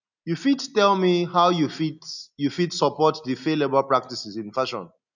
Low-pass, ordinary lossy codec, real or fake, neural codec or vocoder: 7.2 kHz; none; real; none